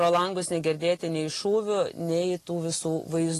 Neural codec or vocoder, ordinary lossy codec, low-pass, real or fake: none; AAC, 48 kbps; 14.4 kHz; real